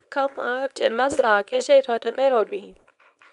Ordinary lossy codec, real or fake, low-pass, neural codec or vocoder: none; fake; 10.8 kHz; codec, 24 kHz, 0.9 kbps, WavTokenizer, small release